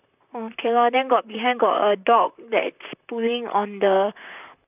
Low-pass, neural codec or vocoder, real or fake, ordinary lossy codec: 3.6 kHz; vocoder, 44.1 kHz, 128 mel bands, Pupu-Vocoder; fake; none